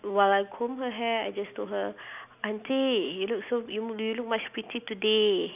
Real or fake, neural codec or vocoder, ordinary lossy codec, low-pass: real; none; none; 3.6 kHz